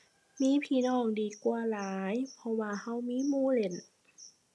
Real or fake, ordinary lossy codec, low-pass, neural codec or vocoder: real; none; none; none